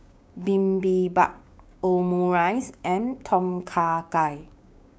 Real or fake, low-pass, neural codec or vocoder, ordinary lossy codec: fake; none; codec, 16 kHz, 6 kbps, DAC; none